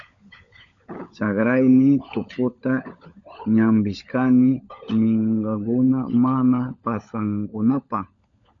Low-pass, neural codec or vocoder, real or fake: 7.2 kHz; codec, 16 kHz, 16 kbps, FunCodec, trained on LibriTTS, 50 frames a second; fake